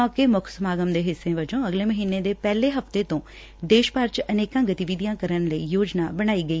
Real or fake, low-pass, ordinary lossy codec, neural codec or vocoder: real; none; none; none